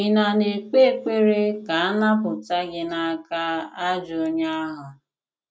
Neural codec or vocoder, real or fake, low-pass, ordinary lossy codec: none; real; none; none